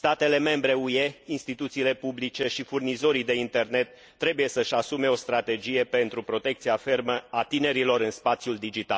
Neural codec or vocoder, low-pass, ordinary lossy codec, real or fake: none; none; none; real